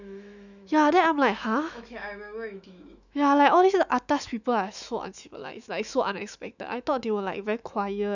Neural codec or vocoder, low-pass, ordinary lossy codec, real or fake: autoencoder, 48 kHz, 128 numbers a frame, DAC-VAE, trained on Japanese speech; 7.2 kHz; none; fake